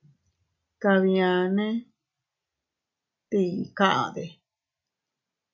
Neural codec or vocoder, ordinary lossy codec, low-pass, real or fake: none; AAC, 48 kbps; 7.2 kHz; real